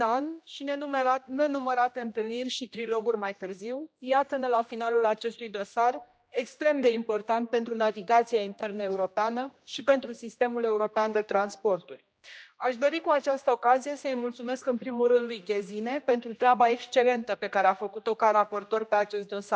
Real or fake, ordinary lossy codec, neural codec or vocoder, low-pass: fake; none; codec, 16 kHz, 1 kbps, X-Codec, HuBERT features, trained on general audio; none